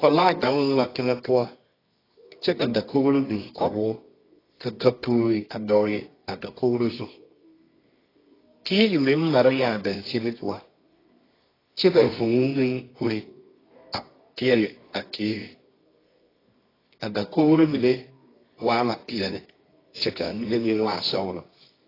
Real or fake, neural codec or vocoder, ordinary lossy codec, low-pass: fake; codec, 24 kHz, 0.9 kbps, WavTokenizer, medium music audio release; AAC, 24 kbps; 5.4 kHz